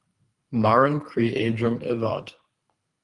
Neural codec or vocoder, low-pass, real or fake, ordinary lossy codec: codec, 24 kHz, 3 kbps, HILCodec; 10.8 kHz; fake; Opus, 32 kbps